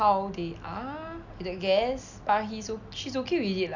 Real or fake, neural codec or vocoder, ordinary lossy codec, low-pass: real; none; none; 7.2 kHz